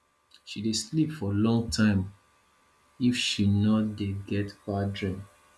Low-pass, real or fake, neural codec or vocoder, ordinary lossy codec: none; real; none; none